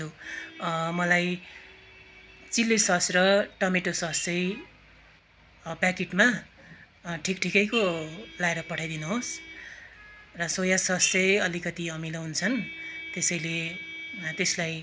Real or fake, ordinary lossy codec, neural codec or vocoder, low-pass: real; none; none; none